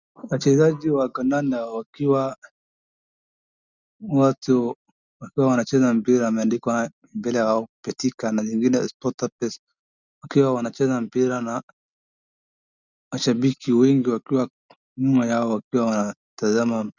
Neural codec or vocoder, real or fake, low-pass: none; real; 7.2 kHz